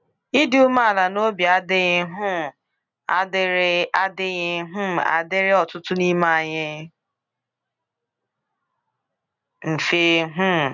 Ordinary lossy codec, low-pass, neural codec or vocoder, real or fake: none; 7.2 kHz; none; real